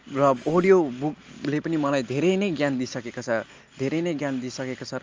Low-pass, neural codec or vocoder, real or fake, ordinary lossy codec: 7.2 kHz; none; real; Opus, 24 kbps